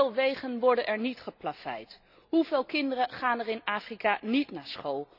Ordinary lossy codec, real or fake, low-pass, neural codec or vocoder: none; real; 5.4 kHz; none